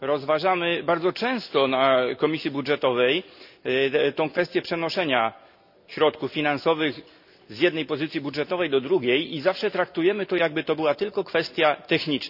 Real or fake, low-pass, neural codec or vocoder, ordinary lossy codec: real; 5.4 kHz; none; none